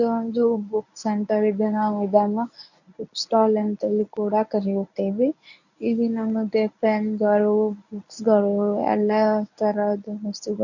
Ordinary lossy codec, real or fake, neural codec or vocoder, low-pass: none; fake; codec, 24 kHz, 0.9 kbps, WavTokenizer, medium speech release version 1; 7.2 kHz